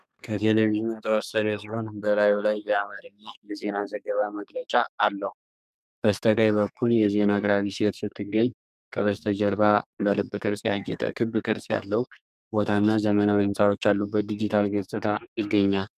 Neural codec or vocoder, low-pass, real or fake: codec, 32 kHz, 1.9 kbps, SNAC; 14.4 kHz; fake